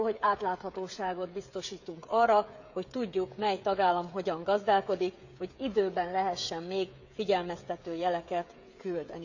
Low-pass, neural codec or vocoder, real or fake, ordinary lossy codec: 7.2 kHz; codec, 16 kHz, 16 kbps, FreqCodec, smaller model; fake; none